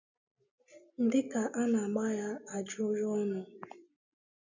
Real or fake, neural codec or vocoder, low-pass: real; none; 7.2 kHz